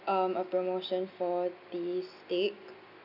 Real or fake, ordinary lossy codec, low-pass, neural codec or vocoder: real; none; 5.4 kHz; none